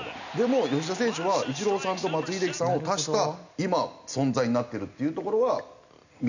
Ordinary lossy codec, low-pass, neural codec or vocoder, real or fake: none; 7.2 kHz; none; real